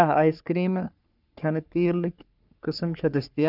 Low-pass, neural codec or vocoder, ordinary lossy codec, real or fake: 5.4 kHz; codec, 44.1 kHz, 3.4 kbps, Pupu-Codec; none; fake